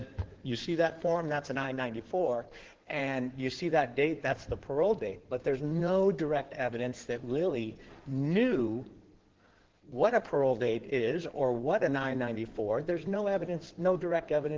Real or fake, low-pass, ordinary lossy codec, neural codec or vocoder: fake; 7.2 kHz; Opus, 16 kbps; codec, 16 kHz in and 24 kHz out, 2.2 kbps, FireRedTTS-2 codec